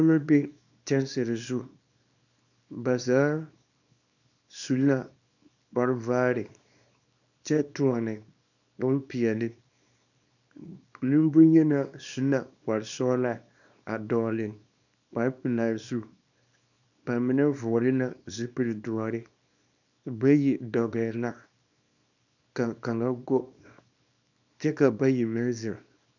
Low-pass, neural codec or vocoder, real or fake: 7.2 kHz; codec, 24 kHz, 0.9 kbps, WavTokenizer, small release; fake